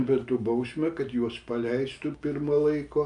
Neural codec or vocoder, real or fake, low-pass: none; real; 9.9 kHz